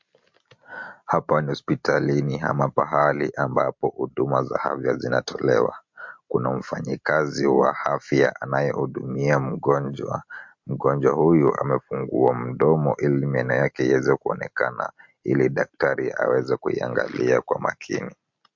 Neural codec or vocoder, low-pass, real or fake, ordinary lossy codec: none; 7.2 kHz; real; MP3, 48 kbps